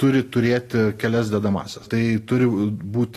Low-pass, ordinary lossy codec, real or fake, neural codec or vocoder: 14.4 kHz; AAC, 48 kbps; real; none